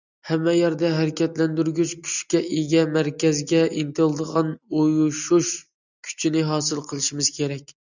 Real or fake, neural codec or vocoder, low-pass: real; none; 7.2 kHz